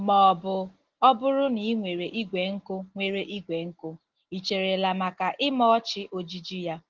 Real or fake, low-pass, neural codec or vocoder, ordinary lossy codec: real; 7.2 kHz; none; Opus, 16 kbps